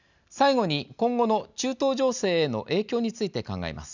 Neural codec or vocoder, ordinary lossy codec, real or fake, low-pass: none; none; real; 7.2 kHz